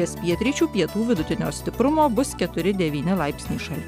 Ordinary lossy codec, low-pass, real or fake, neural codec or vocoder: MP3, 96 kbps; 19.8 kHz; real; none